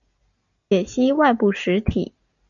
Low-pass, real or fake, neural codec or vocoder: 7.2 kHz; real; none